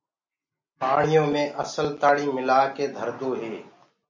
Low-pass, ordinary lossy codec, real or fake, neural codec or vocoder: 7.2 kHz; MP3, 48 kbps; real; none